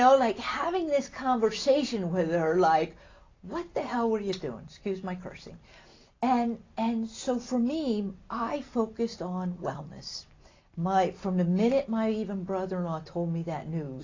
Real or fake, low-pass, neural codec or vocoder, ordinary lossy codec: real; 7.2 kHz; none; AAC, 32 kbps